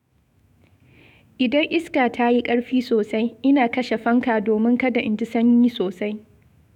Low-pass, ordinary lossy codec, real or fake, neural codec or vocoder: 19.8 kHz; none; fake; autoencoder, 48 kHz, 128 numbers a frame, DAC-VAE, trained on Japanese speech